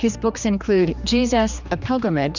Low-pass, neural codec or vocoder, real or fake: 7.2 kHz; codec, 16 kHz, 2 kbps, X-Codec, HuBERT features, trained on general audio; fake